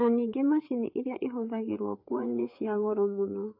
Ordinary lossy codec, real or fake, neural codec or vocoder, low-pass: AAC, 48 kbps; fake; codec, 16 kHz, 4 kbps, FreqCodec, larger model; 5.4 kHz